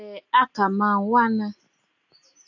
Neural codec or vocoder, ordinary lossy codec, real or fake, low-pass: none; AAC, 48 kbps; real; 7.2 kHz